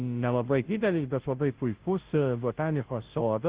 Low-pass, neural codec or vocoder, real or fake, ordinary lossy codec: 3.6 kHz; codec, 16 kHz, 0.5 kbps, FunCodec, trained on Chinese and English, 25 frames a second; fake; Opus, 16 kbps